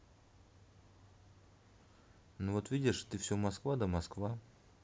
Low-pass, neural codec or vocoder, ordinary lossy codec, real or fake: none; none; none; real